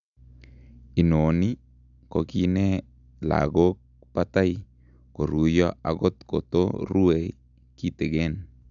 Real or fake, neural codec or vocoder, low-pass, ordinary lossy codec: real; none; 7.2 kHz; none